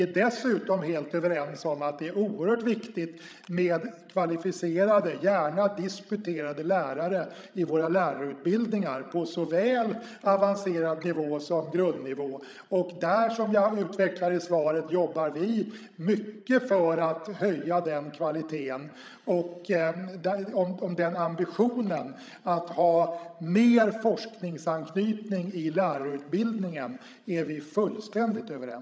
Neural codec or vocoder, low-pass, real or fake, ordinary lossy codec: codec, 16 kHz, 16 kbps, FreqCodec, larger model; none; fake; none